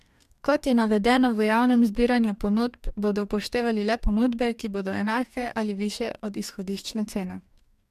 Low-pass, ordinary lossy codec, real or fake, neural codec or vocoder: 14.4 kHz; MP3, 96 kbps; fake; codec, 44.1 kHz, 2.6 kbps, DAC